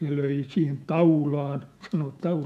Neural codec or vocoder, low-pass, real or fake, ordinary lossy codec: autoencoder, 48 kHz, 128 numbers a frame, DAC-VAE, trained on Japanese speech; 14.4 kHz; fake; none